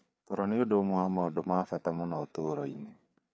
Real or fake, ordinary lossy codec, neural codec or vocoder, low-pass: fake; none; codec, 16 kHz, 4 kbps, FreqCodec, larger model; none